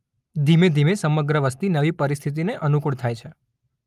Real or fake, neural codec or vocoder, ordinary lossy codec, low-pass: real; none; Opus, 32 kbps; 14.4 kHz